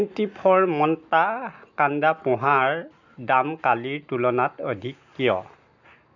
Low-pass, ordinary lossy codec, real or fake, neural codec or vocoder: 7.2 kHz; none; real; none